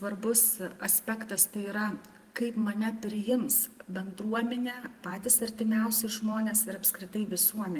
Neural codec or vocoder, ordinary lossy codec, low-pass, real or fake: vocoder, 44.1 kHz, 128 mel bands every 512 samples, BigVGAN v2; Opus, 24 kbps; 14.4 kHz; fake